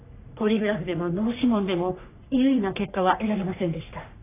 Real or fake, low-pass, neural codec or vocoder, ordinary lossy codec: fake; 3.6 kHz; codec, 44.1 kHz, 3.4 kbps, Pupu-Codec; AAC, 24 kbps